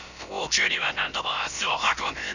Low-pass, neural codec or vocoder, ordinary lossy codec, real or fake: 7.2 kHz; codec, 16 kHz, about 1 kbps, DyCAST, with the encoder's durations; none; fake